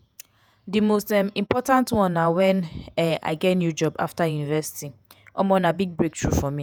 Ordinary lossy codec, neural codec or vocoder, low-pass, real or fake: none; vocoder, 48 kHz, 128 mel bands, Vocos; none; fake